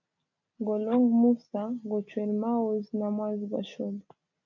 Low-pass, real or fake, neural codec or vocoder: 7.2 kHz; real; none